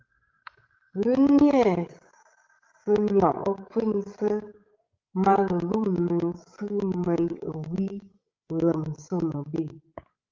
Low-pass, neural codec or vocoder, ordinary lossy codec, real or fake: 7.2 kHz; codec, 16 kHz, 8 kbps, FreqCodec, larger model; Opus, 32 kbps; fake